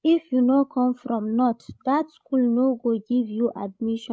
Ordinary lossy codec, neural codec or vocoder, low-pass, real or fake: none; codec, 16 kHz, 16 kbps, FreqCodec, larger model; none; fake